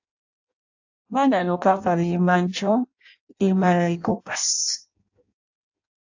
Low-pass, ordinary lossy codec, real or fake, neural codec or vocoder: 7.2 kHz; AAC, 48 kbps; fake; codec, 16 kHz in and 24 kHz out, 0.6 kbps, FireRedTTS-2 codec